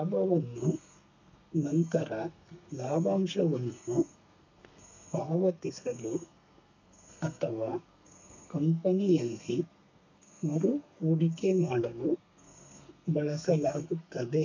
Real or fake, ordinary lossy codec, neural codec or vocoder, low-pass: fake; none; codec, 32 kHz, 1.9 kbps, SNAC; 7.2 kHz